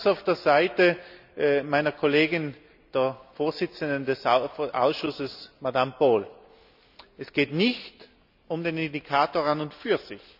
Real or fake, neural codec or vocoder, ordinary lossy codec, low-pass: real; none; none; 5.4 kHz